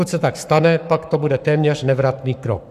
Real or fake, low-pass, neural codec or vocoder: fake; 14.4 kHz; codec, 44.1 kHz, 7.8 kbps, Pupu-Codec